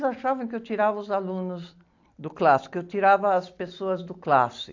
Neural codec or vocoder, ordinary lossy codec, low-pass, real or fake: none; AAC, 48 kbps; 7.2 kHz; real